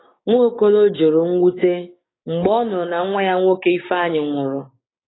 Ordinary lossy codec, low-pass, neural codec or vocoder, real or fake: AAC, 16 kbps; 7.2 kHz; codec, 16 kHz, 6 kbps, DAC; fake